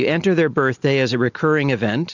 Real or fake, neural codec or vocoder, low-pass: real; none; 7.2 kHz